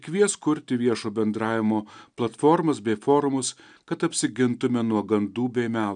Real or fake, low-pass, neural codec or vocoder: real; 9.9 kHz; none